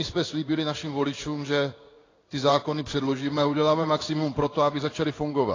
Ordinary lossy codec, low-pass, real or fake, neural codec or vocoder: AAC, 32 kbps; 7.2 kHz; fake; codec, 16 kHz in and 24 kHz out, 1 kbps, XY-Tokenizer